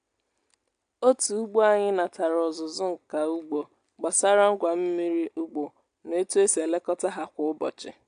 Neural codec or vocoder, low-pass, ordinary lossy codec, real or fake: none; 9.9 kHz; AAC, 64 kbps; real